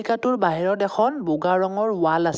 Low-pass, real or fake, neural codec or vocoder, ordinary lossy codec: none; real; none; none